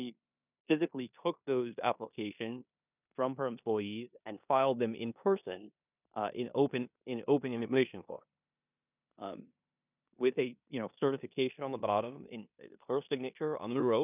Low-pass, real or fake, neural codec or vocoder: 3.6 kHz; fake; codec, 16 kHz in and 24 kHz out, 0.9 kbps, LongCat-Audio-Codec, four codebook decoder